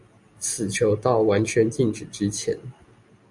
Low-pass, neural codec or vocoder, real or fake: 10.8 kHz; none; real